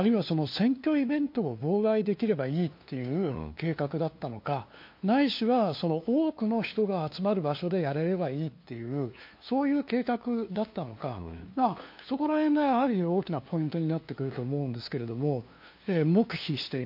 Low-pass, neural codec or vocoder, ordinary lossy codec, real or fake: 5.4 kHz; codec, 16 kHz, 2 kbps, FunCodec, trained on LibriTTS, 25 frames a second; MP3, 32 kbps; fake